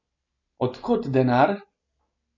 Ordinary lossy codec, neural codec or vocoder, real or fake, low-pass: MP3, 48 kbps; none; real; 7.2 kHz